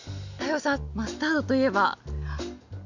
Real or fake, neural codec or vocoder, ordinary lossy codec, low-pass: real; none; none; 7.2 kHz